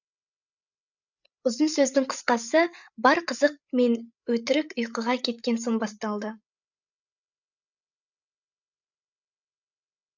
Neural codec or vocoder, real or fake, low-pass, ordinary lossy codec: codec, 16 kHz, 8 kbps, FreqCodec, larger model; fake; 7.2 kHz; none